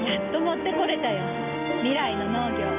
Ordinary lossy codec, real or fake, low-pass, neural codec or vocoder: none; real; 3.6 kHz; none